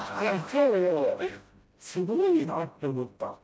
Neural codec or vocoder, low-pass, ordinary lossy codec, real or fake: codec, 16 kHz, 0.5 kbps, FreqCodec, smaller model; none; none; fake